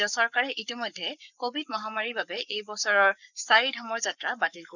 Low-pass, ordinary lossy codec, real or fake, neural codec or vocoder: 7.2 kHz; none; fake; codec, 44.1 kHz, 7.8 kbps, Pupu-Codec